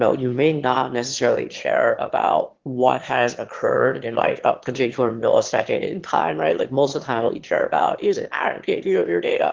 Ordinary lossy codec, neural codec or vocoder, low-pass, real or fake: Opus, 16 kbps; autoencoder, 22.05 kHz, a latent of 192 numbers a frame, VITS, trained on one speaker; 7.2 kHz; fake